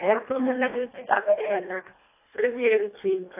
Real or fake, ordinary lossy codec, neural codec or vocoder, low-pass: fake; AAC, 24 kbps; codec, 24 kHz, 1.5 kbps, HILCodec; 3.6 kHz